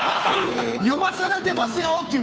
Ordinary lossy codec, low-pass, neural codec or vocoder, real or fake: none; none; codec, 16 kHz, 2 kbps, FunCodec, trained on Chinese and English, 25 frames a second; fake